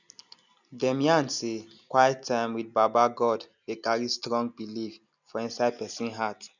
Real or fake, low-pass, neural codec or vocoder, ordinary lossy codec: real; 7.2 kHz; none; none